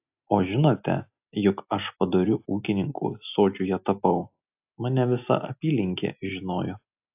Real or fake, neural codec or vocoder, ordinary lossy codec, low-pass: real; none; AAC, 32 kbps; 3.6 kHz